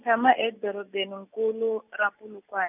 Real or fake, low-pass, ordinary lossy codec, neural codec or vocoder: real; 3.6 kHz; none; none